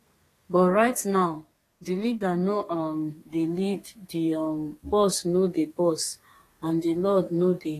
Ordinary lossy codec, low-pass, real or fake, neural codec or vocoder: AAC, 48 kbps; 14.4 kHz; fake; codec, 32 kHz, 1.9 kbps, SNAC